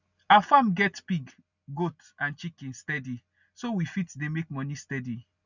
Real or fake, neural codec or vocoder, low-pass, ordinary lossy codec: real; none; 7.2 kHz; none